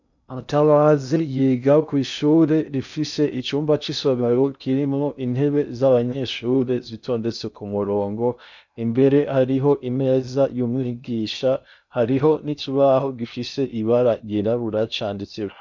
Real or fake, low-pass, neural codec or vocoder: fake; 7.2 kHz; codec, 16 kHz in and 24 kHz out, 0.6 kbps, FocalCodec, streaming, 2048 codes